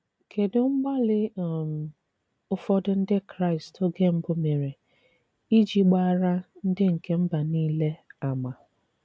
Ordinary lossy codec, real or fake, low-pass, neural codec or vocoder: none; real; none; none